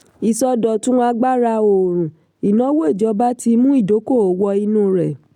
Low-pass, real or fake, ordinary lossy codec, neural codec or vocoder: 19.8 kHz; real; none; none